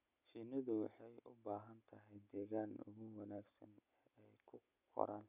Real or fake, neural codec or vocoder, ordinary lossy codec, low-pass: real; none; none; 3.6 kHz